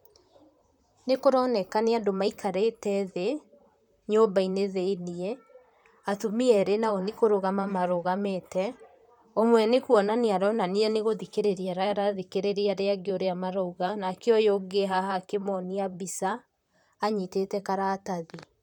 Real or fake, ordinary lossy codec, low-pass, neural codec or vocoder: fake; none; 19.8 kHz; vocoder, 44.1 kHz, 128 mel bands, Pupu-Vocoder